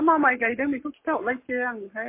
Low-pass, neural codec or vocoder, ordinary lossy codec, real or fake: 3.6 kHz; codec, 44.1 kHz, 7.8 kbps, Pupu-Codec; MP3, 24 kbps; fake